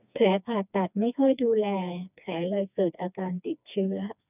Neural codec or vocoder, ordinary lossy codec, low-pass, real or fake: codec, 16 kHz, 2 kbps, FreqCodec, smaller model; none; 3.6 kHz; fake